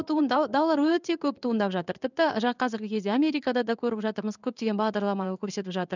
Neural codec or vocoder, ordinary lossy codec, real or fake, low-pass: codec, 16 kHz in and 24 kHz out, 1 kbps, XY-Tokenizer; none; fake; 7.2 kHz